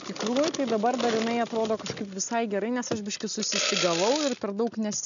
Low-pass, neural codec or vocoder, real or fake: 7.2 kHz; none; real